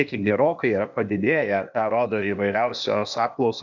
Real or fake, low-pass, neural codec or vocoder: fake; 7.2 kHz; codec, 16 kHz, 0.8 kbps, ZipCodec